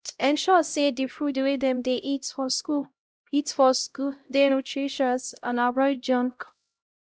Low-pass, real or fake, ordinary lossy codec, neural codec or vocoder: none; fake; none; codec, 16 kHz, 0.5 kbps, X-Codec, HuBERT features, trained on LibriSpeech